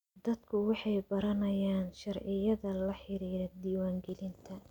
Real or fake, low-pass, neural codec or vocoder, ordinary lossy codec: real; 19.8 kHz; none; none